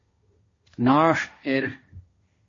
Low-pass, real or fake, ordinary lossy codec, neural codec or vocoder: 7.2 kHz; fake; MP3, 32 kbps; codec, 16 kHz, 1.1 kbps, Voila-Tokenizer